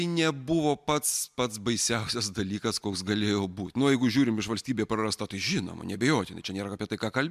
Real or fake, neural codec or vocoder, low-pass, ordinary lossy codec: real; none; 14.4 kHz; MP3, 96 kbps